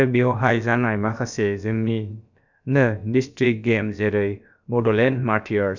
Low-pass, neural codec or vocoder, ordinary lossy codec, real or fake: 7.2 kHz; codec, 16 kHz, about 1 kbps, DyCAST, with the encoder's durations; none; fake